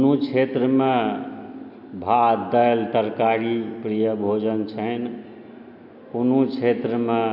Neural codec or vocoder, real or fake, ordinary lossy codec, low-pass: none; real; none; 5.4 kHz